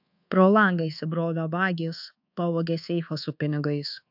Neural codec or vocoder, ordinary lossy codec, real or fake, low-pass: codec, 24 kHz, 1.2 kbps, DualCodec; AAC, 48 kbps; fake; 5.4 kHz